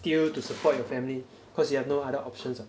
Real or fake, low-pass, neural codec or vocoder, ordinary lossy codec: real; none; none; none